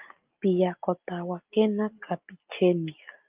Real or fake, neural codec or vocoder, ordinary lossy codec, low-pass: real; none; Opus, 24 kbps; 3.6 kHz